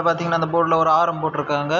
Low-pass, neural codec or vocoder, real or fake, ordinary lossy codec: 7.2 kHz; none; real; none